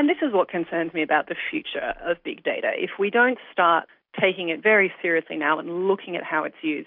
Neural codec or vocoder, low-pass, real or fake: none; 5.4 kHz; real